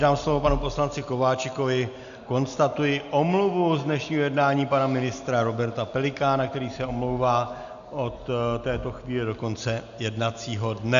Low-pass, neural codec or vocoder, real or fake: 7.2 kHz; none; real